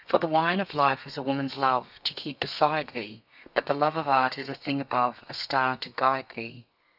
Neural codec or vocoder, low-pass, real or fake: codec, 32 kHz, 1.9 kbps, SNAC; 5.4 kHz; fake